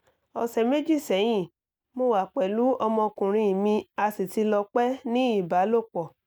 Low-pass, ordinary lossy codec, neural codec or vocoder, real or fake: none; none; none; real